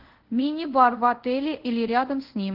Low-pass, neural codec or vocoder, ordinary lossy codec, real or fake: 5.4 kHz; codec, 24 kHz, 0.5 kbps, DualCodec; Opus, 16 kbps; fake